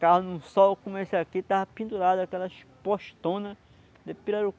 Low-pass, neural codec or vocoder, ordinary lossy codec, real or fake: none; none; none; real